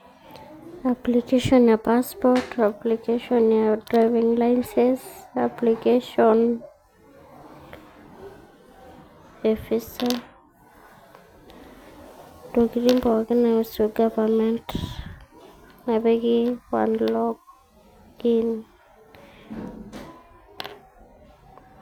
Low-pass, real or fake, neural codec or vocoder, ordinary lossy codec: 19.8 kHz; real; none; none